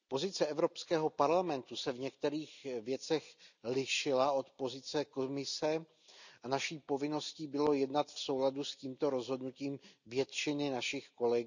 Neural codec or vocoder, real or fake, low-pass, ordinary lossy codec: none; real; 7.2 kHz; none